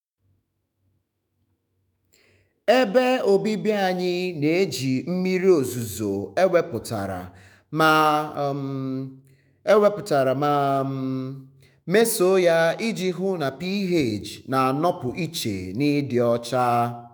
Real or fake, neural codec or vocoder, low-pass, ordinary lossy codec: fake; autoencoder, 48 kHz, 128 numbers a frame, DAC-VAE, trained on Japanese speech; none; none